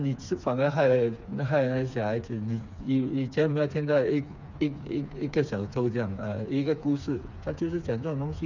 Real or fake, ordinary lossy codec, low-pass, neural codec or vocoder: fake; none; 7.2 kHz; codec, 16 kHz, 4 kbps, FreqCodec, smaller model